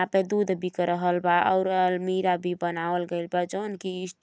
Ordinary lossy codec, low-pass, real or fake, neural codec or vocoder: none; none; real; none